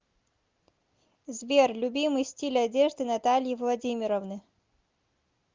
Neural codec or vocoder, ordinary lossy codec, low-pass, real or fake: none; Opus, 24 kbps; 7.2 kHz; real